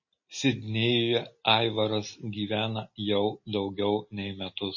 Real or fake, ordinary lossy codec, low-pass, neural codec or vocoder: real; MP3, 32 kbps; 7.2 kHz; none